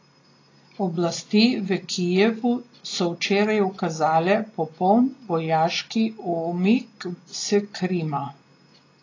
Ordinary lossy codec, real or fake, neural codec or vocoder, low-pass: AAC, 32 kbps; real; none; 7.2 kHz